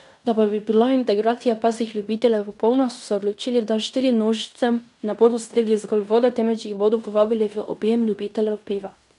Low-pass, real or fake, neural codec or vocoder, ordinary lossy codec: 10.8 kHz; fake; codec, 16 kHz in and 24 kHz out, 0.9 kbps, LongCat-Audio-Codec, fine tuned four codebook decoder; none